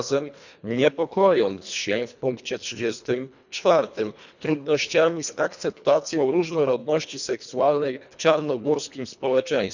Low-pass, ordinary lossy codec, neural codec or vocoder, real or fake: 7.2 kHz; none; codec, 24 kHz, 1.5 kbps, HILCodec; fake